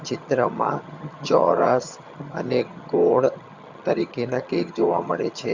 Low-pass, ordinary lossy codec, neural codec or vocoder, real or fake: 7.2 kHz; Opus, 64 kbps; vocoder, 22.05 kHz, 80 mel bands, HiFi-GAN; fake